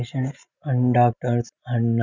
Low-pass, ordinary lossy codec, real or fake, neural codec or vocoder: 7.2 kHz; none; real; none